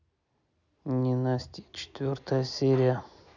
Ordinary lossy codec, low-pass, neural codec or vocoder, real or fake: none; 7.2 kHz; none; real